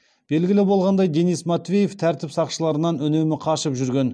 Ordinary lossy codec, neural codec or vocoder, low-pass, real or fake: none; none; none; real